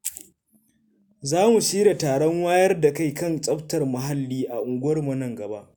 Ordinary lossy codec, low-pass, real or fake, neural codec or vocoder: none; none; real; none